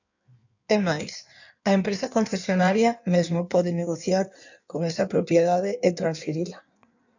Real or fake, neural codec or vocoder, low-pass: fake; codec, 16 kHz in and 24 kHz out, 1.1 kbps, FireRedTTS-2 codec; 7.2 kHz